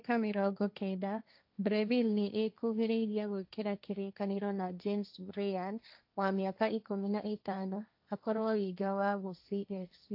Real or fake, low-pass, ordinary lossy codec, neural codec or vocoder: fake; 5.4 kHz; AAC, 48 kbps; codec, 16 kHz, 1.1 kbps, Voila-Tokenizer